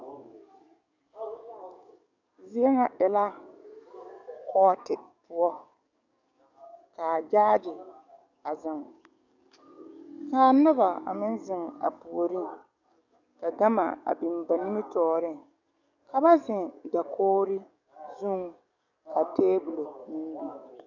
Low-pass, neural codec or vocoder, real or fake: 7.2 kHz; codec, 44.1 kHz, 7.8 kbps, DAC; fake